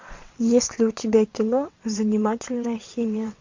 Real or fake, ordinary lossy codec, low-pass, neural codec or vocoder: fake; MP3, 48 kbps; 7.2 kHz; vocoder, 22.05 kHz, 80 mel bands, WaveNeXt